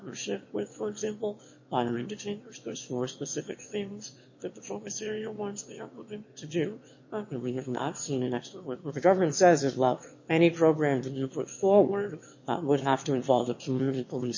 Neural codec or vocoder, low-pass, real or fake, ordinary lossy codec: autoencoder, 22.05 kHz, a latent of 192 numbers a frame, VITS, trained on one speaker; 7.2 kHz; fake; MP3, 32 kbps